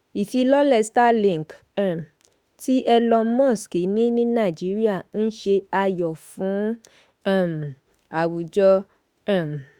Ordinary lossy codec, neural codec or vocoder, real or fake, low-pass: Opus, 64 kbps; autoencoder, 48 kHz, 32 numbers a frame, DAC-VAE, trained on Japanese speech; fake; 19.8 kHz